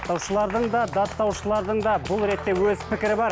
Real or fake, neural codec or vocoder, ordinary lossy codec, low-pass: real; none; none; none